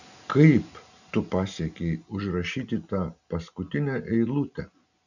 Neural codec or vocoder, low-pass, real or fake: none; 7.2 kHz; real